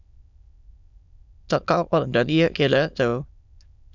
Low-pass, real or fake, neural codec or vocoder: 7.2 kHz; fake; autoencoder, 22.05 kHz, a latent of 192 numbers a frame, VITS, trained on many speakers